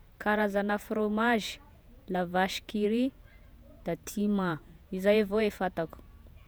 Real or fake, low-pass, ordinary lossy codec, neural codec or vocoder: fake; none; none; vocoder, 48 kHz, 128 mel bands, Vocos